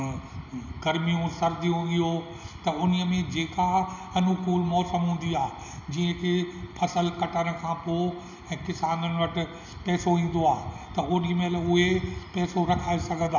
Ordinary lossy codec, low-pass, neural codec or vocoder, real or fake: none; 7.2 kHz; none; real